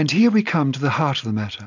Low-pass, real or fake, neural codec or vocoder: 7.2 kHz; real; none